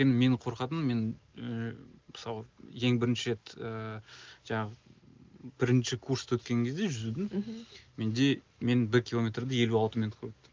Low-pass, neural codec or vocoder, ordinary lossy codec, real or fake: 7.2 kHz; none; Opus, 24 kbps; real